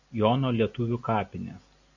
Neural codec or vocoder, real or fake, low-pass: none; real; 7.2 kHz